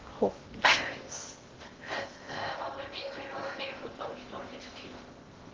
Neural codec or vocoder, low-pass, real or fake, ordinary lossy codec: codec, 16 kHz in and 24 kHz out, 0.6 kbps, FocalCodec, streaming, 2048 codes; 7.2 kHz; fake; Opus, 16 kbps